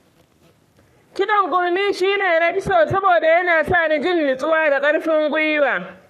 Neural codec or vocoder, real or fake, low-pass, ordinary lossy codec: codec, 44.1 kHz, 3.4 kbps, Pupu-Codec; fake; 14.4 kHz; none